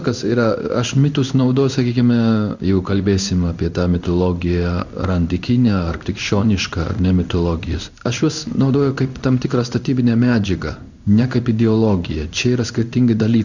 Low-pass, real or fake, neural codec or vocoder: 7.2 kHz; fake; codec, 16 kHz in and 24 kHz out, 1 kbps, XY-Tokenizer